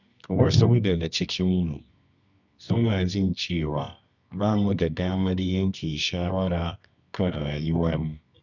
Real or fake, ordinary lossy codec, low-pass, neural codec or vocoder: fake; none; 7.2 kHz; codec, 24 kHz, 0.9 kbps, WavTokenizer, medium music audio release